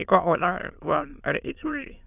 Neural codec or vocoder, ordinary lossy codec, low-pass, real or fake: autoencoder, 22.05 kHz, a latent of 192 numbers a frame, VITS, trained on many speakers; none; 3.6 kHz; fake